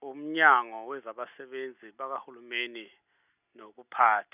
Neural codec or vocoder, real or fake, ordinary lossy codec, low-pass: none; real; none; 3.6 kHz